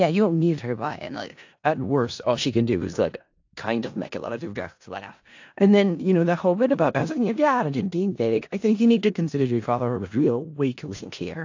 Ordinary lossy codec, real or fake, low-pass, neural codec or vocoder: AAC, 48 kbps; fake; 7.2 kHz; codec, 16 kHz in and 24 kHz out, 0.4 kbps, LongCat-Audio-Codec, four codebook decoder